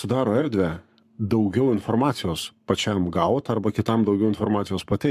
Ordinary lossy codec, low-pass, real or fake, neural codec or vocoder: MP3, 96 kbps; 14.4 kHz; fake; codec, 44.1 kHz, 7.8 kbps, Pupu-Codec